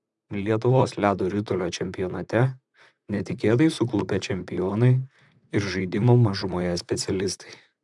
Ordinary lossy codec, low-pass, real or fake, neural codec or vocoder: MP3, 96 kbps; 10.8 kHz; fake; vocoder, 44.1 kHz, 128 mel bands, Pupu-Vocoder